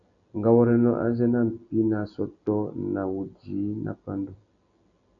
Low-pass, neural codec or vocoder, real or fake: 7.2 kHz; none; real